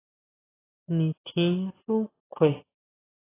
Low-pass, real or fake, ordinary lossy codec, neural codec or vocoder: 3.6 kHz; real; AAC, 16 kbps; none